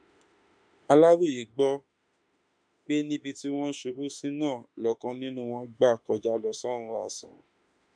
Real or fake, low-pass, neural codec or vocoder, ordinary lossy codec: fake; 9.9 kHz; autoencoder, 48 kHz, 32 numbers a frame, DAC-VAE, trained on Japanese speech; none